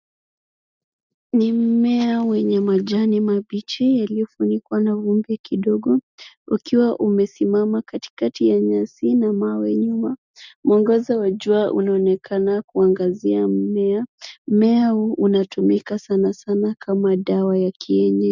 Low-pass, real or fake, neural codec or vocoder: 7.2 kHz; real; none